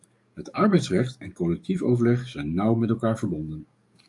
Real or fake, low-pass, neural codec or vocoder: fake; 10.8 kHz; codec, 44.1 kHz, 7.8 kbps, DAC